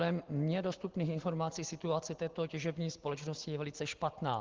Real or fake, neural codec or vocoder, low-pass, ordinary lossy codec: real; none; 7.2 kHz; Opus, 16 kbps